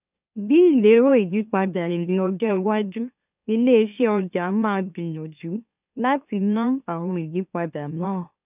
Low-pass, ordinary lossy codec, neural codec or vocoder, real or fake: 3.6 kHz; none; autoencoder, 44.1 kHz, a latent of 192 numbers a frame, MeloTTS; fake